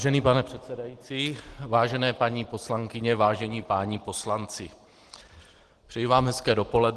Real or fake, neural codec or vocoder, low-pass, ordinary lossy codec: real; none; 10.8 kHz; Opus, 16 kbps